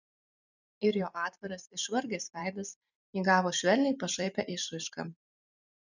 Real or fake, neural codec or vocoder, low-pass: fake; vocoder, 44.1 kHz, 128 mel bands every 256 samples, BigVGAN v2; 7.2 kHz